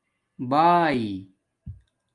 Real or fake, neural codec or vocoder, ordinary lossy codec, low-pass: real; none; Opus, 32 kbps; 10.8 kHz